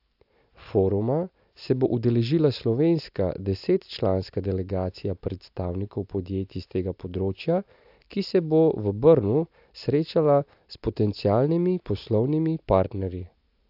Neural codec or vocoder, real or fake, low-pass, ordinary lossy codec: none; real; 5.4 kHz; AAC, 48 kbps